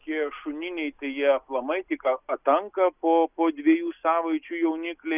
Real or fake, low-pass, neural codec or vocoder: real; 3.6 kHz; none